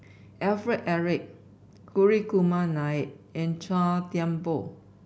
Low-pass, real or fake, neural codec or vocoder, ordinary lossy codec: none; real; none; none